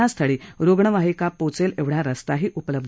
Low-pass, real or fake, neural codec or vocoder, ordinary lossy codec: none; real; none; none